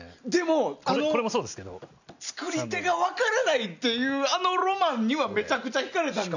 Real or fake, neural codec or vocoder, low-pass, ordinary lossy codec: real; none; 7.2 kHz; none